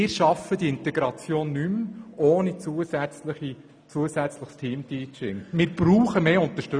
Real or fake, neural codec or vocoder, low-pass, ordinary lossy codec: real; none; 9.9 kHz; none